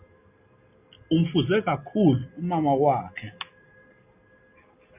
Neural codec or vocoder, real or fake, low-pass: none; real; 3.6 kHz